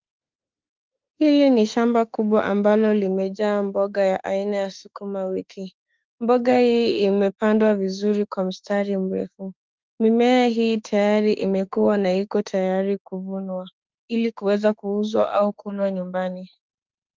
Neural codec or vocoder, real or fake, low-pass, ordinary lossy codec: autoencoder, 48 kHz, 32 numbers a frame, DAC-VAE, trained on Japanese speech; fake; 7.2 kHz; Opus, 32 kbps